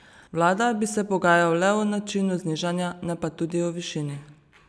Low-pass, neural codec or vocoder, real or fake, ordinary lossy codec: none; none; real; none